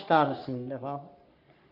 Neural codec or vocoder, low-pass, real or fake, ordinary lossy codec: codec, 44.1 kHz, 3.4 kbps, Pupu-Codec; 5.4 kHz; fake; none